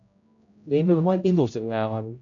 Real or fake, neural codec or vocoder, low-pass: fake; codec, 16 kHz, 0.5 kbps, X-Codec, HuBERT features, trained on general audio; 7.2 kHz